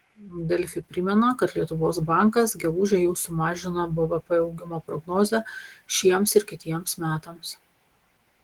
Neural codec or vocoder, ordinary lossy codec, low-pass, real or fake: autoencoder, 48 kHz, 128 numbers a frame, DAC-VAE, trained on Japanese speech; Opus, 16 kbps; 19.8 kHz; fake